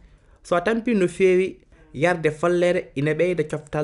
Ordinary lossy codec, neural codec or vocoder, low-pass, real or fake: none; none; 10.8 kHz; real